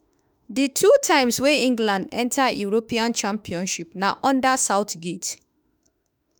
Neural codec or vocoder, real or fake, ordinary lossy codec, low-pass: autoencoder, 48 kHz, 32 numbers a frame, DAC-VAE, trained on Japanese speech; fake; none; none